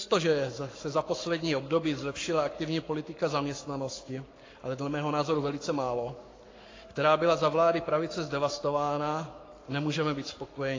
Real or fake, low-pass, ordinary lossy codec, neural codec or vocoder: fake; 7.2 kHz; AAC, 32 kbps; codec, 44.1 kHz, 7.8 kbps, Pupu-Codec